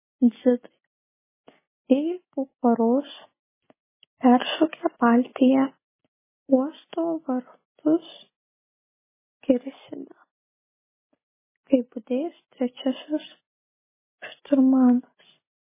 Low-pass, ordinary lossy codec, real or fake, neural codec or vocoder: 3.6 kHz; MP3, 16 kbps; real; none